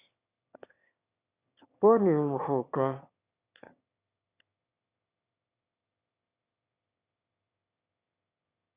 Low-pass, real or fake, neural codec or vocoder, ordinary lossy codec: 3.6 kHz; fake; autoencoder, 22.05 kHz, a latent of 192 numbers a frame, VITS, trained on one speaker; Opus, 64 kbps